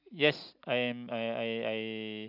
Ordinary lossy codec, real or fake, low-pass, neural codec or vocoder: none; real; 5.4 kHz; none